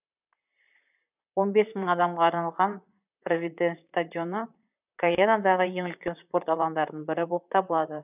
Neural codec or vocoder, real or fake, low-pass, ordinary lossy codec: vocoder, 44.1 kHz, 128 mel bands, Pupu-Vocoder; fake; 3.6 kHz; none